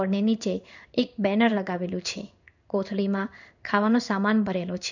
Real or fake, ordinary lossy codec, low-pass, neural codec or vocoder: fake; none; 7.2 kHz; codec, 16 kHz in and 24 kHz out, 1 kbps, XY-Tokenizer